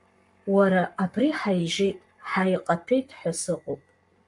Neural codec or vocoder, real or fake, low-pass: codec, 44.1 kHz, 7.8 kbps, Pupu-Codec; fake; 10.8 kHz